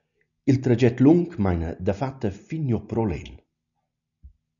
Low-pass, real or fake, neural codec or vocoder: 7.2 kHz; real; none